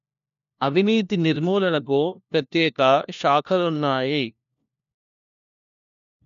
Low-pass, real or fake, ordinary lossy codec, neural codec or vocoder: 7.2 kHz; fake; none; codec, 16 kHz, 1 kbps, FunCodec, trained on LibriTTS, 50 frames a second